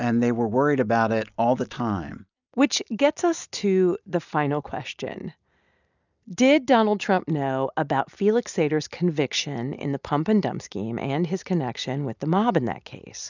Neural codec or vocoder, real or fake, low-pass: none; real; 7.2 kHz